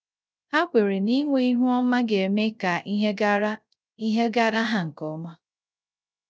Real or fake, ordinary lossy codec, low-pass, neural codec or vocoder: fake; none; none; codec, 16 kHz, 0.3 kbps, FocalCodec